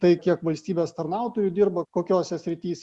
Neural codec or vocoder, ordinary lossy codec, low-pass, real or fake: none; Opus, 64 kbps; 10.8 kHz; real